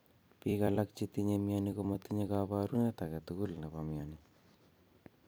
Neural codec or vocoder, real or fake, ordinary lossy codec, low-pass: vocoder, 44.1 kHz, 128 mel bands every 256 samples, BigVGAN v2; fake; none; none